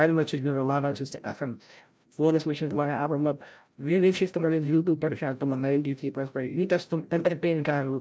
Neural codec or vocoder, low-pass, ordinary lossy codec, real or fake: codec, 16 kHz, 0.5 kbps, FreqCodec, larger model; none; none; fake